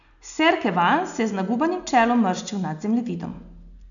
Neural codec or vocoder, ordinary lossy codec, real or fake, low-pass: none; none; real; 7.2 kHz